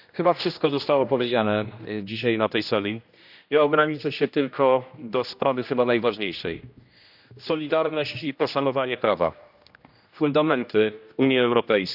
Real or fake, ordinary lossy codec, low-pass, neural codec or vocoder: fake; none; 5.4 kHz; codec, 16 kHz, 1 kbps, X-Codec, HuBERT features, trained on general audio